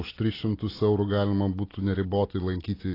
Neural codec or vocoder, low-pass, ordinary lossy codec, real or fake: none; 5.4 kHz; AAC, 24 kbps; real